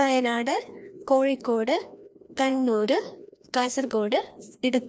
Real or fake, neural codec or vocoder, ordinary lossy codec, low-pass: fake; codec, 16 kHz, 1 kbps, FreqCodec, larger model; none; none